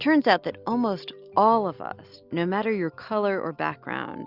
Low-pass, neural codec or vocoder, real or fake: 5.4 kHz; none; real